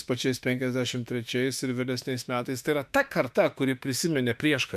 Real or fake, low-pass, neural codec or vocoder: fake; 14.4 kHz; autoencoder, 48 kHz, 32 numbers a frame, DAC-VAE, trained on Japanese speech